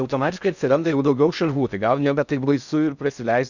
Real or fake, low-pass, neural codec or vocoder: fake; 7.2 kHz; codec, 16 kHz in and 24 kHz out, 0.6 kbps, FocalCodec, streaming, 4096 codes